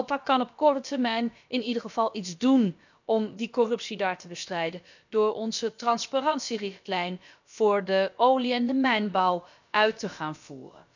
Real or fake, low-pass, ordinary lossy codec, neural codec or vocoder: fake; 7.2 kHz; none; codec, 16 kHz, about 1 kbps, DyCAST, with the encoder's durations